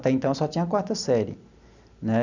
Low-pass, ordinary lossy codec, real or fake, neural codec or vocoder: 7.2 kHz; none; real; none